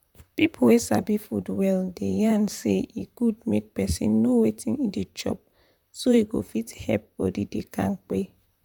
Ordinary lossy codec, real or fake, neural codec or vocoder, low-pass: none; fake; vocoder, 44.1 kHz, 128 mel bands, Pupu-Vocoder; 19.8 kHz